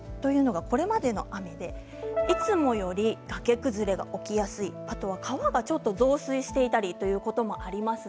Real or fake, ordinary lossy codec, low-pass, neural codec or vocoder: real; none; none; none